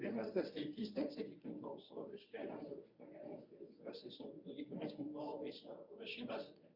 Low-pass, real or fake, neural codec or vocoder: 5.4 kHz; fake; codec, 24 kHz, 0.9 kbps, WavTokenizer, medium speech release version 1